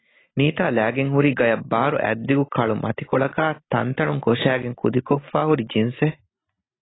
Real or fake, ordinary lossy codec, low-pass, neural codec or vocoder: real; AAC, 16 kbps; 7.2 kHz; none